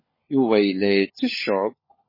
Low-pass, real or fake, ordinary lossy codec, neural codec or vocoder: 5.4 kHz; fake; MP3, 24 kbps; codec, 16 kHz, 16 kbps, FunCodec, trained on LibriTTS, 50 frames a second